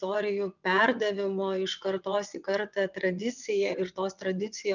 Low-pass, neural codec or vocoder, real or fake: 7.2 kHz; vocoder, 22.05 kHz, 80 mel bands, WaveNeXt; fake